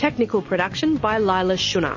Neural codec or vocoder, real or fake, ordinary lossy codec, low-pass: none; real; MP3, 32 kbps; 7.2 kHz